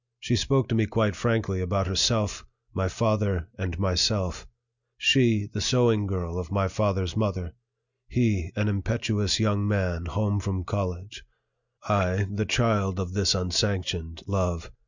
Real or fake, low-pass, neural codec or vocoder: real; 7.2 kHz; none